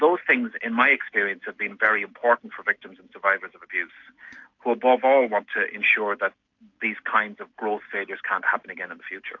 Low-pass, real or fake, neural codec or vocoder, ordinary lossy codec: 7.2 kHz; real; none; AAC, 48 kbps